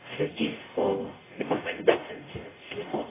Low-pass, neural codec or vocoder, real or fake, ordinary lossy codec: 3.6 kHz; codec, 44.1 kHz, 0.9 kbps, DAC; fake; AAC, 16 kbps